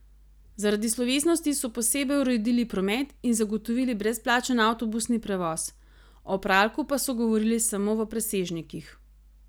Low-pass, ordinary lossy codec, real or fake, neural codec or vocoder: none; none; real; none